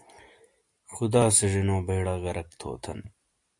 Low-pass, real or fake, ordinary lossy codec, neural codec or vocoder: 10.8 kHz; real; AAC, 64 kbps; none